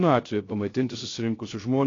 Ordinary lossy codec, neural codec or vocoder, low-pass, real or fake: AAC, 32 kbps; codec, 16 kHz, 0.3 kbps, FocalCodec; 7.2 kHz; fake